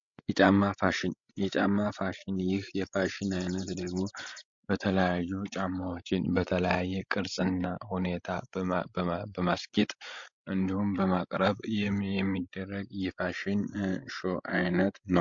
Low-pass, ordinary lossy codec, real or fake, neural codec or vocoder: 7.2 kHz; MP3, 48 kbps; fake; codec, 16 kHz, 16 kbps, FreqCodec, larger model